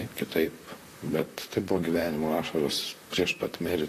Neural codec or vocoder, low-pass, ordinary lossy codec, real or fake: vocoder, 44.1 kHz, 128 mel bands, Pupu-Vocoder; 14.4 kHz; AAC, 48 kbps; fake